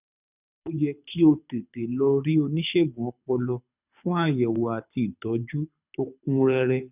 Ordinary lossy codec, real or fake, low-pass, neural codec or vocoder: none; fake; 3.6 kHz; codec, 24 kHz, 6 kbps, HILCodec